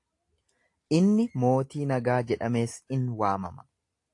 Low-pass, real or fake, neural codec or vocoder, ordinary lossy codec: 10.8 kHz; real; none; AAC, 48 kbps